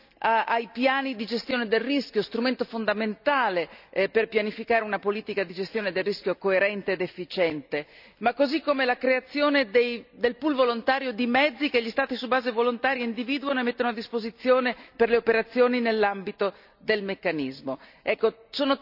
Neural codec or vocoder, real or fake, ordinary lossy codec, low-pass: none; real; none; 5.4 kHz